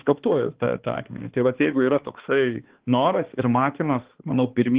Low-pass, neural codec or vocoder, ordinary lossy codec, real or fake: 3.6 kHz; codec, 16 kHz, 2 kbps, X-Codec, HuBERT features, trained on balanced general audio; Opus, 16 kbps; fake